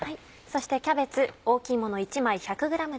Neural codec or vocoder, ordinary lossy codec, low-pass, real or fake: none; none; none; real